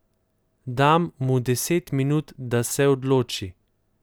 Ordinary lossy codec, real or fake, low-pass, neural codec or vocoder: none; real; none; none